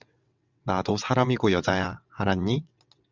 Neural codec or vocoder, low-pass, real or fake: vocoder, 22.05 kHz, 80 mel bands, WaveNeXt; 7.2 kHz; fake